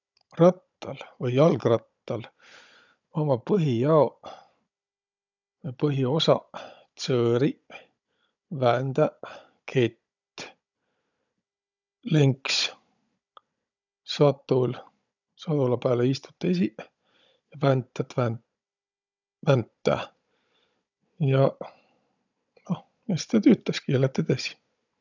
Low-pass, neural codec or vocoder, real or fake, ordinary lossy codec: 7.2 kHz; codec, 16 kHz, 16 kbps, FunCodec, trained on Chinese and English, 50 frames a second; fake; none